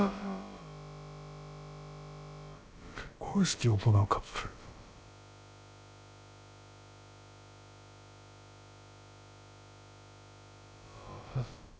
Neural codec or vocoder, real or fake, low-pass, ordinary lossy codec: codec, 16 kHz, about 1 kbps, DyCAST, with the encoder's durations; fake; none; none